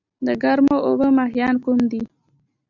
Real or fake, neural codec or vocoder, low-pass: real; none; 7.2 kHz